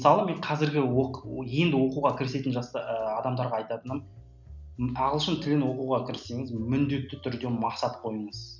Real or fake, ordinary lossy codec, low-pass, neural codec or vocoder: real; none; 7.2 kHz; none